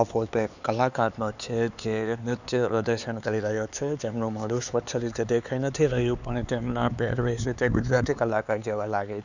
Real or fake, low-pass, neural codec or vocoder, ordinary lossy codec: fake; 7.2 kHz; codec, 16 kHz, 4 kbps, X-Codec, HuBERT features, trained on LibriSpeech; none